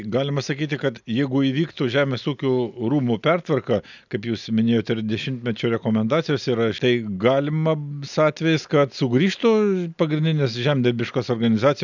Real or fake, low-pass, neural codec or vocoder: real; 7.2 kHz; none